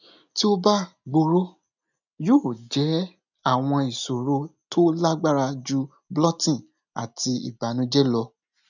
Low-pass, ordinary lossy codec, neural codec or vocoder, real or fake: 7.2 kHz; none; none; real